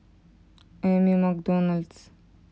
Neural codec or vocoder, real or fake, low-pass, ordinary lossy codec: none; real; none; none